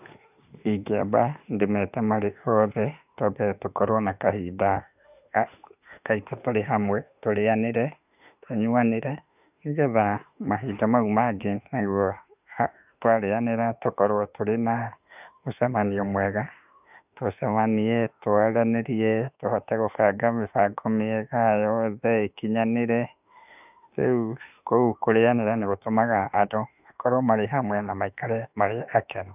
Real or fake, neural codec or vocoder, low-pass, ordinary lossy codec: fake; autoencoder, 48 kHz, 32 numbers a frame, DAC-VAE, trained on Japanese speech; 3.6 kHz; none